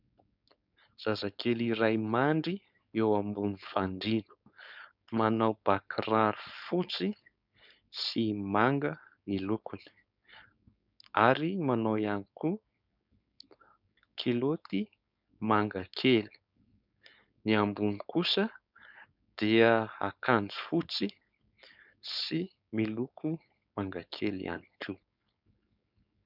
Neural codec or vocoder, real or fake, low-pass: codec, 16 kHz, 4.8 kbps, FACodec; fake; 5.4 kHz